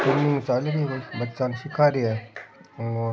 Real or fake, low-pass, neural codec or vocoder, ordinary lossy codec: real; none; none; none